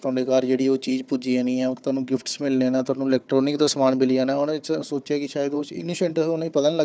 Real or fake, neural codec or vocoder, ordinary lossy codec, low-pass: fake; codec, 16 kHz, 4 kbps, FunCodec, trained on Chinese and English, 50 frames a second; none; none